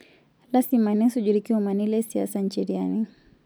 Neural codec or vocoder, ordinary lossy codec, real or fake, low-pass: none; none; real; none